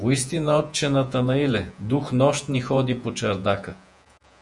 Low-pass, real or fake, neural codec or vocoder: 10.8 kHz; fake; vocoder, 48 kHz, 128 mel bands, Vocos